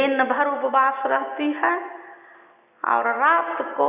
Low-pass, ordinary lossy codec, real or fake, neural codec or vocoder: 3.6 kHz; none; real; none